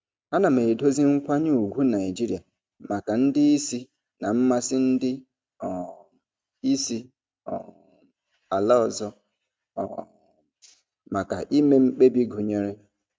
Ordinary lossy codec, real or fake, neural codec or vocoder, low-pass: none; real; none; none